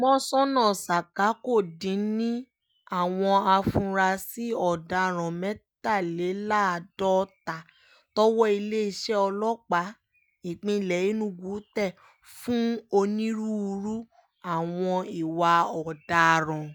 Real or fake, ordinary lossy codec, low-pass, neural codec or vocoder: real; none; none; none